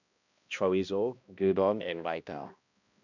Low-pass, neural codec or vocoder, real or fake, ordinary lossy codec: 7.2 kHz; codec, 16 kHz, 1 kbps, X-Codec, HuBERT features, trained on balanced general audio; fake; none